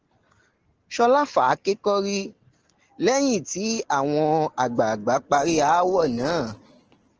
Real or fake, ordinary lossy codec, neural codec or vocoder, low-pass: real; Opus, 16 kbps; none; 7.2 kHz